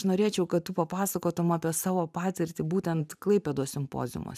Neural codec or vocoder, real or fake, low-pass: vocoder, 44.1 kHz, 128 mel bands every 512 samples, BigVGAN v2; fake; 14.4 kHz